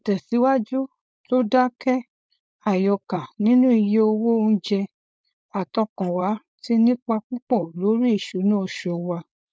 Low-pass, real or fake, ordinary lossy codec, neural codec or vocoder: none; fake; none; codec, 16 kHz, 4.8 kbps, FACodec